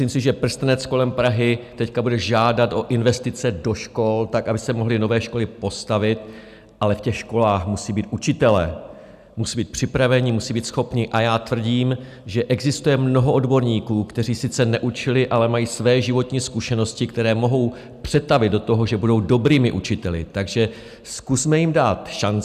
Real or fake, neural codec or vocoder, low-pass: real; none; 14.4 kHz